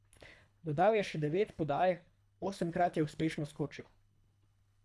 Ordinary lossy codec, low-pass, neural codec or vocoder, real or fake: none; none; codec, 24 kHz, 3 kbps, HILCodec; fake